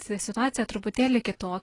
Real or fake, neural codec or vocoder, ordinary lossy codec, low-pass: real; none; AAC, 32 kbps; 10.8 kHz